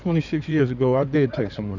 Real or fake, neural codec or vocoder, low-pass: fake; codec, 16 kHz in and 24 kHz out, 2.2 kbps, FireRedTTS-2 codec; 7.2 kHz